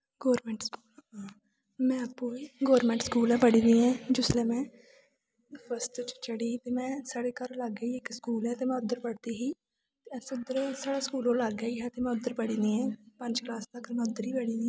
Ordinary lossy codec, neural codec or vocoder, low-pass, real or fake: none; none; none; real